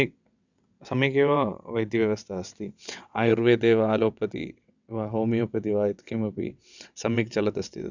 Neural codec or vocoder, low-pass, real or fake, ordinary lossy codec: vocoder, 22.05 kHz, 80 mel bands, WaveNeXt; 7.2 kHz; fake; none